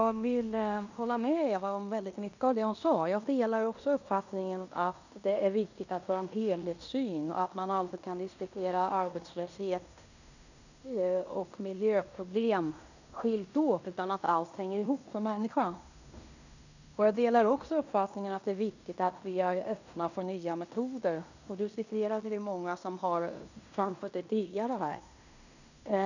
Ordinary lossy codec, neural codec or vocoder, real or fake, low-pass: none; codec, 16 kHz in and 24 kHz out, 0.9 kbps, LongCat-Audio-Codec, fine tuned four codebook decoder; fake; 7.2 kHz